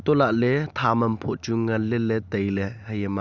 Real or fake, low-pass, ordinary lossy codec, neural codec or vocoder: real; 7.2 kHz; Opus, 64 kbps; none